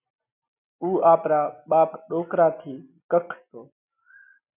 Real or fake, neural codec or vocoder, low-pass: real; none; 3.6 kHz